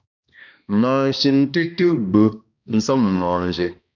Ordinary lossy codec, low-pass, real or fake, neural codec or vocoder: MP3, 64 kbps; 7.2 kHz; fake; codec, 16 kHz, 1 kbps, X-Codec, HuBERT features, trained on balanced general audio